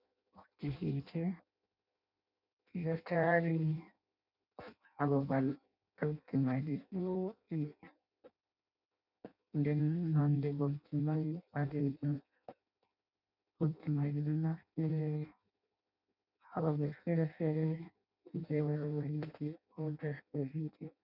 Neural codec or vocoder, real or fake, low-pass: codec, 16 kHz in and 24 kHz out, 0.6 kbps, FireRedTTS-2 codec; fake; 5.4 kHz